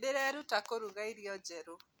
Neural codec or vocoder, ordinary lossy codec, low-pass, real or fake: none; none; none; real